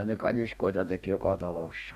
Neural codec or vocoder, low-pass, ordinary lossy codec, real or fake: codec, 44.1 kHz, 2.6 kbps, DAC; 14.4 kHz; none; fake